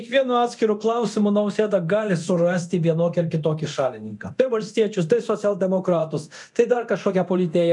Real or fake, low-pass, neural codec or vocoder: fake; 10.8 kHz; codec, 24 kHz, 0.9 kbps, DualCodec